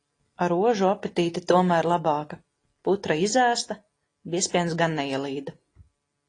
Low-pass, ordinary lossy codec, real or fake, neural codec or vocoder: 9.9 kHz; AAC, 32 kbps; real; none